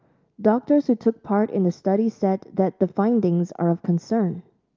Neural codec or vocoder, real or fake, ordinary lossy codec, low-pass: none; real; Opus, 24 kbps; 7.2 kHz